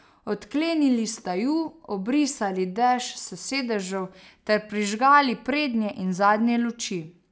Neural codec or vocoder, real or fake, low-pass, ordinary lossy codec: none; real; none; none